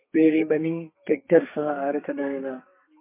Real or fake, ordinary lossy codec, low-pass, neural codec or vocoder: fake; MP3, 24 kbps; 3.6 kHz; codec, 32 kHz, 1.9 kbps, SNAC